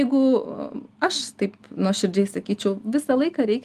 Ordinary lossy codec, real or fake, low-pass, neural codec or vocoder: Opus, 32 kbps; real; 14.4 kHz; none